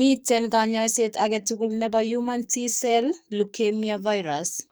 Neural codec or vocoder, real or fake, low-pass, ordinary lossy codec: codec, 44.1 kHz, 2.6 kbps, SNAC; fake; none; none